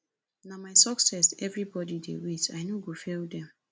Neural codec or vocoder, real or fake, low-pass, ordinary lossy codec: none; real; none; none